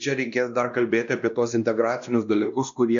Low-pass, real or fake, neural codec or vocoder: 7.2 kHz; fake; codec, 16 kHz, 1 kbps, X-Codec, WavLM features, trained on Multilingual LibriSpeech